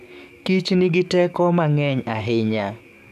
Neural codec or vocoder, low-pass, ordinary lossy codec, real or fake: codec, 44.1 kHz, 7.8 kbps, DAC; 14.4 kHz; none; fake